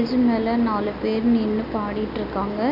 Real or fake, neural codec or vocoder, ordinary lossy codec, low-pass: real; none; none; 5.4 kHz